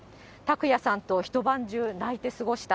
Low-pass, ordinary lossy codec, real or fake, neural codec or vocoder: none; none; real; none